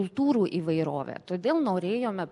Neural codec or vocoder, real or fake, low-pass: none; real; 10.8 kHz